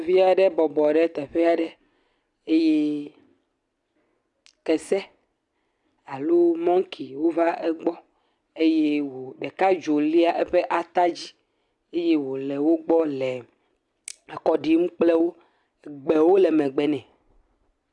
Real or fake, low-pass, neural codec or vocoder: real; 10.8 kHz; none